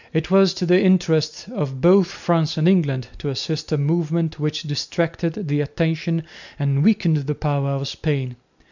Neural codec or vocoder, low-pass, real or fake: none; 7.2 kHz; real